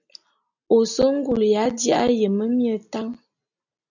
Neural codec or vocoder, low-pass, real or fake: none; 7.2 kHz; real